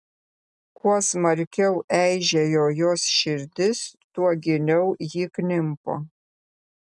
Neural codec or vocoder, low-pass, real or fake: none; 10.8 kHz; real